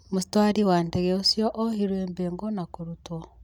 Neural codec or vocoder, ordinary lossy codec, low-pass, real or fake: none; none; 19.8 kHz; real